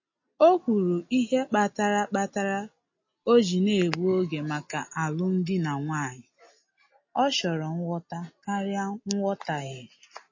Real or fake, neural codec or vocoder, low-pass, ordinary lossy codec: real; none; 7.2 kHz; MP3, 32 kbps